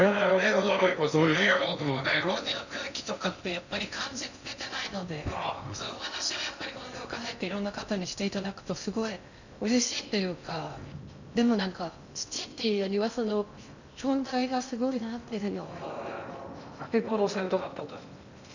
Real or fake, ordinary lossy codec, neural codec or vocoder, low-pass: fake; none; codec, 16 kHz in and 24 kHz out, 0.6 kbps, FocalCodec, streaming, 2048 codes; 7.2 kHz